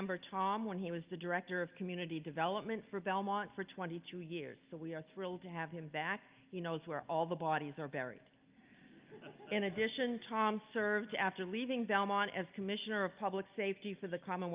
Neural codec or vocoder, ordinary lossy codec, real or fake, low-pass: none; Opus, 24 kbps; real; 3.6 kHz